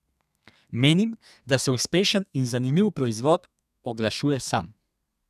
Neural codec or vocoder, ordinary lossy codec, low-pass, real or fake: codec, 32 kHz, 1.9 kbps, SNAC; none; 14.4 kHz; fake